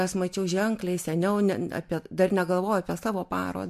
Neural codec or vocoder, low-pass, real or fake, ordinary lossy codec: none; 14.4 kHz; real; MP3, 64 kbps